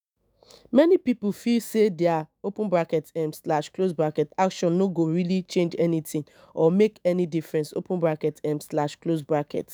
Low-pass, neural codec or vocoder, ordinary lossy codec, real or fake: none; autoencoder, 48 kHz, 128 numbers a frame, DAC-VAE, trained on Japanese speech; none; fake